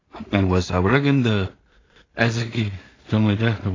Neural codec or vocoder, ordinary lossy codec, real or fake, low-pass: codec, 16 kHz in and 24 kHz out, 0.4 kbps, LongCat-Audio-Codec, two codebook decoder; AAC, 32 kbps; fake; 7.2 kHz